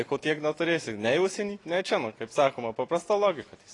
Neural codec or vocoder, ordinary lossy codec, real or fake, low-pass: none; AAC, 32 kbps; real; 10.8 kHz